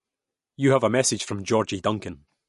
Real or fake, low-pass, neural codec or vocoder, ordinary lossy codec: real; 14.4 kHz; none; MP3, 48 kbps